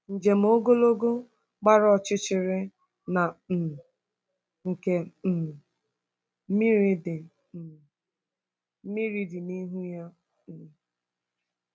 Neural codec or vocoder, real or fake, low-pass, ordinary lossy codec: none; real; none; none